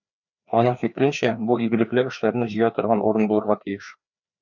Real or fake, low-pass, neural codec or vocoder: fake; 7.2 kHz; codec, 16 kHz, 2 kbps, FreqCodec, larger model